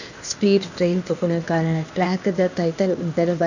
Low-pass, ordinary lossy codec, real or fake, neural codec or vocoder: 7.2 kHz; none; fake; codec, 16 kHz, 0.8 kbps, ZipCodec